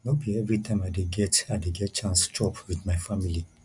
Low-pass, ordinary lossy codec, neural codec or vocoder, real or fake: 10.8 kHz; none; none; real